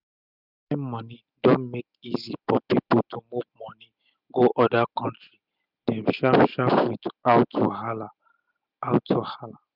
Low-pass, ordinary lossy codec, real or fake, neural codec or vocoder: 5.4 kHz; none; real; none